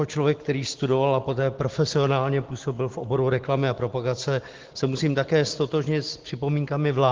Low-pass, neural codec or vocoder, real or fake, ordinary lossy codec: 7.2 kHz; none; real; Opus, 16 kbps